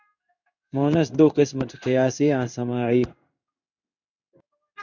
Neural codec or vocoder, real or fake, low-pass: codec, 16 kHz in and 24 kHz out, 1 kbps, XY-Tokenizer; fake; 7.2 kHz